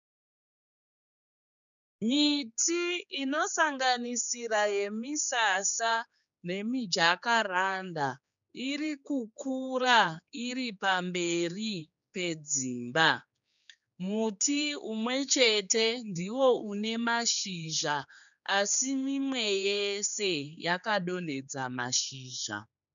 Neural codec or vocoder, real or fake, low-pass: codec, 16 kHz, 4 kbps, X-Codec, HuBERT features, trained on general audio; fake; 7.2 kHz